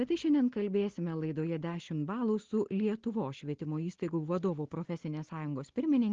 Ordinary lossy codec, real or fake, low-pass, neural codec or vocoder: Opus, 16 kbps; real; 7.2 kHz; none